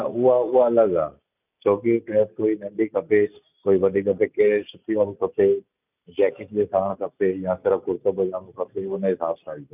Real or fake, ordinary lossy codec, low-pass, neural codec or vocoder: real; none; 3.6 kHz; none